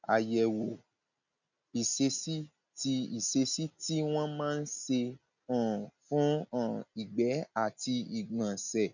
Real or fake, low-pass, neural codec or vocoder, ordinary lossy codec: real; 7.2 kHz; none; none